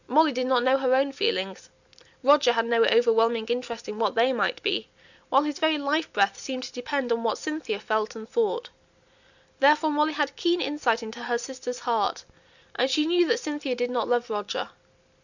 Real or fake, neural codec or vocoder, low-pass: real; none; 7.2 kHz